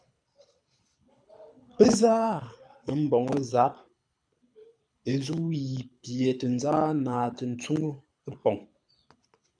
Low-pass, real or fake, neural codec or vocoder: 9.9 kHz; fake; codec, 24 kHz, 6 kbps, HILCodec